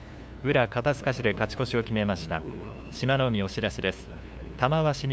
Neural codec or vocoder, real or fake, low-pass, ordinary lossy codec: codec, 16 kHz, 2 kbps, FunCodec, trained on LibriTTS, 25 frames a second; fake; none; none